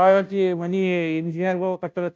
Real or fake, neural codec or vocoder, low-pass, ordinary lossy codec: fake; codec, 16 kHz, 0.5 kbps, FunCodec, trained on Chinese and English, 25 frames a second; none; none